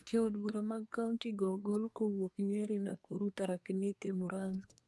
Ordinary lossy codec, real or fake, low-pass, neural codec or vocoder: none; fake; none; codec, 24 kHz, 1 kbps, SNAC